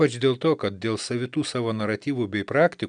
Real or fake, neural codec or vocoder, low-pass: real; none; 9.9 kHz